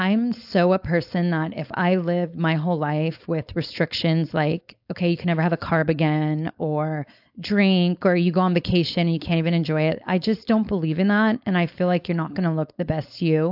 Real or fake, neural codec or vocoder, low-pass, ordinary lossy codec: fake; codec, 16 kHz, 4.8 kbps, FACodec; 5.4 kHz; AAC, 48 kbps